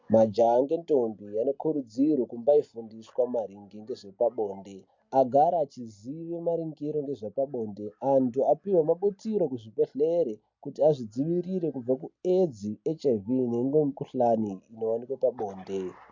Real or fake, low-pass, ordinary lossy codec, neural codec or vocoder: real; 7.2 kHz; MP3, 48 kbps; none